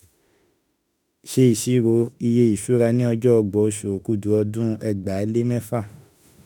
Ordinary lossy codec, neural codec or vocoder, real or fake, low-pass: none; autoencoder, 48 kHz, 32 numbers a frame, DAC-VAE, trained on Japanese speech; fake; none